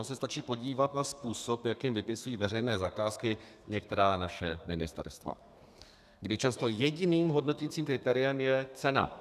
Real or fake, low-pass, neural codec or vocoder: fake; 14.4 kHz; codec, 32 kHz, 1.9 kbps, SNAC